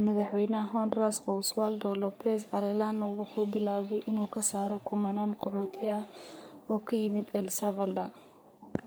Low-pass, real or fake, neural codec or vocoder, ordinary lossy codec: none; fake; codec, 44.1 kHz, 3.4 kbps, Pupu-Codec; none